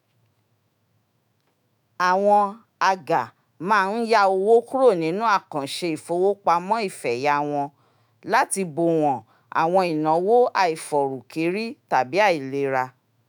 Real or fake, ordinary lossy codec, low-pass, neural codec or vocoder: fake; none; none; autoencoder, 48 kHz, 128 numbers a frame, DAC-VAE, trained on Japanese speech